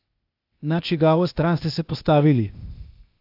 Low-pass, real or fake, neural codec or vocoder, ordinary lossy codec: 5.4 kHz; fake; codec, 16 kHz, 0.8 kbps, ZipCodec; AAC, 48 kbps